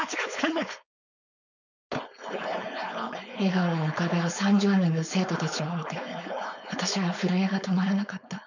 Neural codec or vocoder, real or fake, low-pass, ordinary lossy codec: codec, 16 kHz, 4.8 kbps, FACodec; fake; 7.2 kHz; none